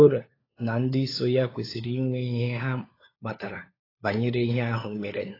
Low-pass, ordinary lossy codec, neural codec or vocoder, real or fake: 5.4 kHz; AAC, 24 kbps; codec, 16 kHz, 4 kbps, FunCodec, trained on LibriTTS, 50 frames a second; fake